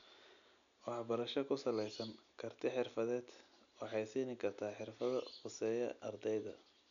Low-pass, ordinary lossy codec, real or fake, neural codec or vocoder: 7.2 kHz; none; real; none